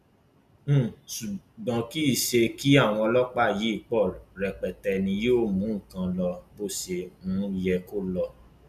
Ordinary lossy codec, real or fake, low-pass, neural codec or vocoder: none; real; 14.4 kHz; none